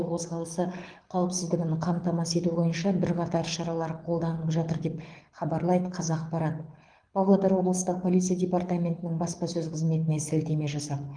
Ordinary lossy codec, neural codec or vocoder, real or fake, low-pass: Opus, 24 kbps; codec, 24 kHz, 6 kbps, HILCodec; fake; 9.9 kHz